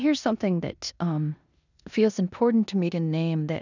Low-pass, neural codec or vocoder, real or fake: 7.2 kHz; codec, 16 kHz in and 24 kHz out, 0.9 kbps, LongCat-Audio-Codec, four codebook decoder; fake